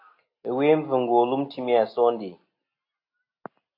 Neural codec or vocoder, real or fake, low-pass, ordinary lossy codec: none; real; 5.4 kHz; AAC, 32 kbps